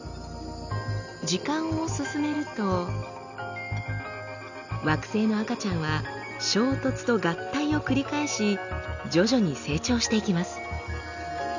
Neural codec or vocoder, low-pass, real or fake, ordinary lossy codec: none; 7.2 kHz; real; none